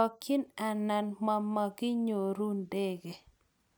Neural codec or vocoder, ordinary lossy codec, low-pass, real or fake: none; none; none; real